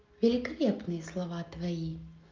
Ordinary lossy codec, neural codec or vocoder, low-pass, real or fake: Opus, 32 kbps; none; 7.2 kHz; real